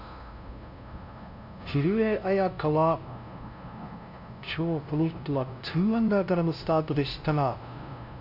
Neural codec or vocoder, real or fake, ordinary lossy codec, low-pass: codec, 16 kHz, 0.5 kbps, FunCodec, trained on LibriTTS, 25 frames a second; fake; none; 5.4 kHz